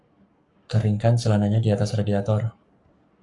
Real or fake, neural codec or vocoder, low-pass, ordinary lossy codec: fake; codec, 44.1 kHz, 7.8 kbps, Pupu-Codec; 10.8 kHz; Opus, 64 kbps